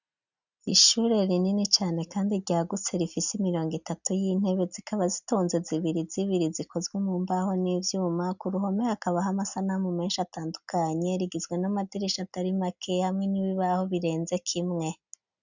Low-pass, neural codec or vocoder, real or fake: 7.2 kHz; none; real